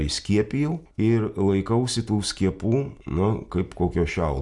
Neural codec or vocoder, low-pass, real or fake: none; 10.8 kHz; real